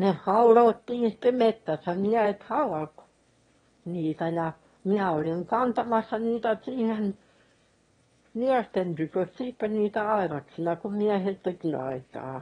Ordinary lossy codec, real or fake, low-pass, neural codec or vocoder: AAC, 32 kbps; fake; 9.9 kHz; autoencoder, 22.05 kHz, a latent of 192 numbers a frame, VITS, trained on one speaker